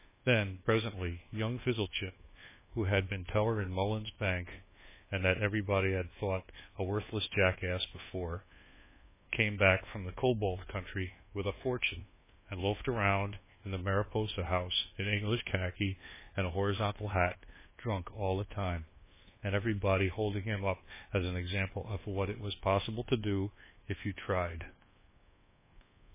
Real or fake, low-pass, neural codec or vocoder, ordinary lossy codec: fake; 3.6 kHz; codec, 24 kHz, 1.2 kbps, DualCodec; MP3, 16 kbps